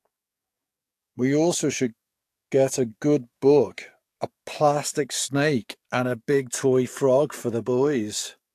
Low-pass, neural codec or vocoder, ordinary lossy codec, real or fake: 14.4 kHz; codec, 44.1 kHz, 7.8 kbps, DAC; AAC, 64 kbps; fake